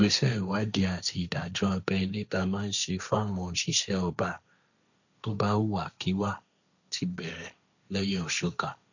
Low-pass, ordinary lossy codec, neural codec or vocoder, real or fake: 7.2 kHz; none; codec, 16 kHz, 1.1 kbps, Voila-Tokenizer; fake